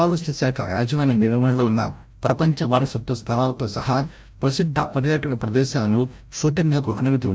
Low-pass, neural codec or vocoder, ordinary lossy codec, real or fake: none; codec, 16 kHz, 0.5 kbps, FreqCodec, larger model; none; fake